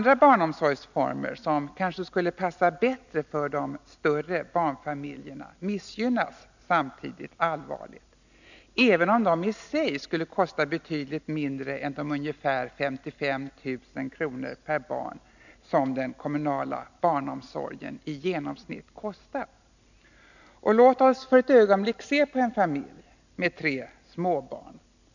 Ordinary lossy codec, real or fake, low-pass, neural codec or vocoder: none; real; 7.2 kHz; none